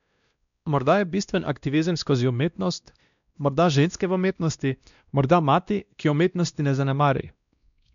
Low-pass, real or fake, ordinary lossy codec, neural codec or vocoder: 7.2 kHz; fake; none; codec, 16 kHz, 1 kbps, X-Codec, WavLM features, trained on Multilingual LibriSpeech